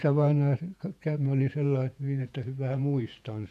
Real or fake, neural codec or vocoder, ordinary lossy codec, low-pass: fake; vocoder, 48 kHz, 128 mel bands, Vocos; none; 14.4 kHz